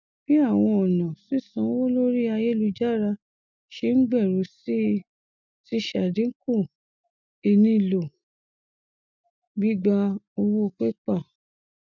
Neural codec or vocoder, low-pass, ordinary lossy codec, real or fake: none; 7.2 kHz; none; real